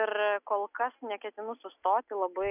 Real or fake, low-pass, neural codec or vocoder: real; 3.6 kHz; none